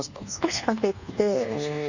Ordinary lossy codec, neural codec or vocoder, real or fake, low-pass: none; codec, 24 kHz, 1.2 kbps, DualCodec; fake; 7.2 kHz